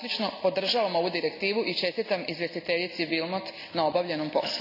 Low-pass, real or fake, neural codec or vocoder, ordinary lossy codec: 5.4 kHz; real; none; none